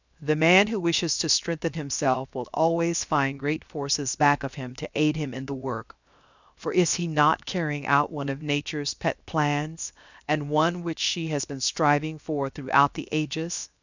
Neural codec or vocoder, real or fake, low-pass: codec, 16 kHz, 0.7 kbps, FocalCodec; fake; 7.2 kHz